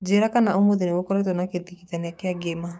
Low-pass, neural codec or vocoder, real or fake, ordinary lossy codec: none; codec, 16 kHz, 6 kbps, DAC; fake; none